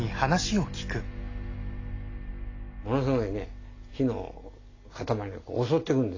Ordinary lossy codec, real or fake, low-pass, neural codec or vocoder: AAC, 32 kbps; real; 7.2 kHz; none